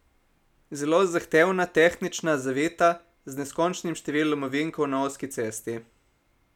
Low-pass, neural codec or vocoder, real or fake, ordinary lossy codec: 19.8 kHz; none; real; none